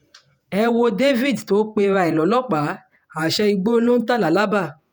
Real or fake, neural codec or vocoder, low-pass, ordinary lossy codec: fake; vocoder, 48 kHz, 128 mel bands, Vocos; none; none